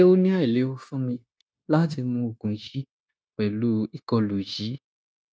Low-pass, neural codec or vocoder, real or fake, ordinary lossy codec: none; codec, 16 kHz, 0.9 kbps, LongCat-Audio-Codec; fake; none